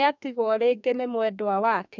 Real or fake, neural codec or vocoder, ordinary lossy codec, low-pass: fake; codec, 32 kHz, 1.9 kbps, SNAC; none; 7.2 kHz